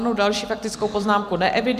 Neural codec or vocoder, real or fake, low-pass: vocoder, 44.1 kHz, 128 mel bands every 256 samples, BigVGAN v2; fake; 14.4 kHz